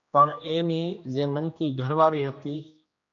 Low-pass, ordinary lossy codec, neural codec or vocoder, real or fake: 7.2 kHz; AAC, 64 kbps; codec, 16 kHz, 1 kbps, X-Codec, HuBERT features, trained on general audio; fake